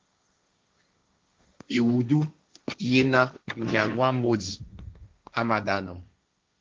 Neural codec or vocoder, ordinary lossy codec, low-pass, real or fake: codec, 16 kHz, 1.1 kbps, Voila-Tokenizer; Opus, 24 kbps; 7.2 kHz; fake